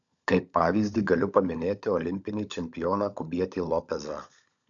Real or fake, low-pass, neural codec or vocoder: fake; 7.2 kHz; codec, 16 kHz, 16 kbps, FunCodec, trained on LibriTTS, 50 frames a second